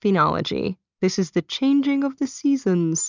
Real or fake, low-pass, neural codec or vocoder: real; 7.2 kHz; none